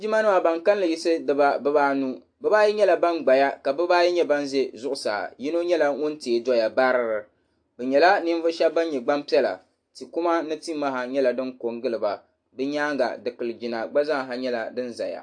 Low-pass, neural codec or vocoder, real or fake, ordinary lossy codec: 9.9 kHz; none; real; AAC, 64 kbps